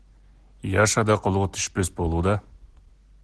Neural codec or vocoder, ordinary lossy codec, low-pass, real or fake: none; Opus, 16 kbps; 10.8 kHz; real